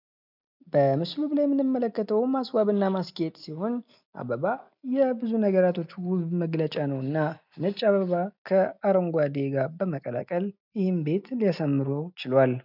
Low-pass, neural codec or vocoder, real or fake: 5.4 kHz; none; real